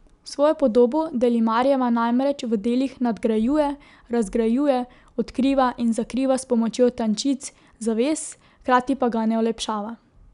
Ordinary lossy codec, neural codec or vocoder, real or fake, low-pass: none; none; real; 10.8 kHz